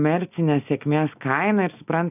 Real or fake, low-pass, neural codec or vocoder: real; 3.6 kHz; none